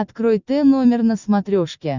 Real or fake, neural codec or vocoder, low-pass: real; none; 7.2 kHz